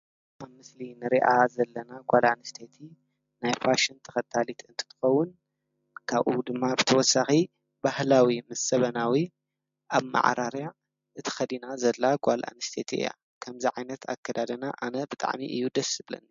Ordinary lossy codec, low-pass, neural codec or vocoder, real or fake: MP3, 48 kbps; 7.2 kHz; none; real